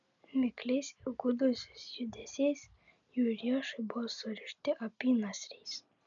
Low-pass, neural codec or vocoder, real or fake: 7.2 kHz; none; real